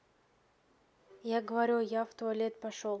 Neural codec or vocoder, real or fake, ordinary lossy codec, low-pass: none; real; none; none